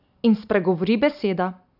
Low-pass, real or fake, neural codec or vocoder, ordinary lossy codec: 5.4 kHz; real; none; none